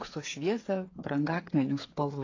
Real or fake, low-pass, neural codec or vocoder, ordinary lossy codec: fake; 7.2 kHz; codec, 16 kHz, 8 kbps, FreqCodec, smaller model; AAC, 32 kbps